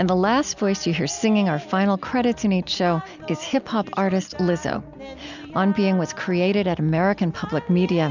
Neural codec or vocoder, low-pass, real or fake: none; 7.2 kHz; real